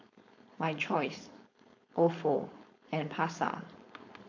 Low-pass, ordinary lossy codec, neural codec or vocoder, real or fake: 7.2 kHz; AAC, 48 kbps; codec, 16 kHz, 4.8 kbps, FACodec; fake